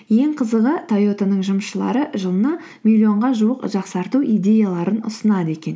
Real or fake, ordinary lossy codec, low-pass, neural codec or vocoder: real; none; none; none